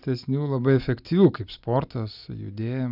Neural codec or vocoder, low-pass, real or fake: none; 5.4 kHz; real